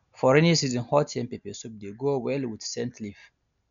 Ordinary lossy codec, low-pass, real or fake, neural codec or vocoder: none; 7.2 kHz; real; none